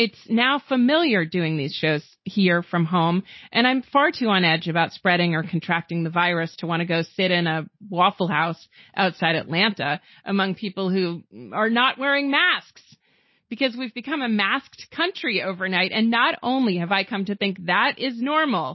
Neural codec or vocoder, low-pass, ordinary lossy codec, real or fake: none; 7.2 kHz; MP3, 24 kbps; real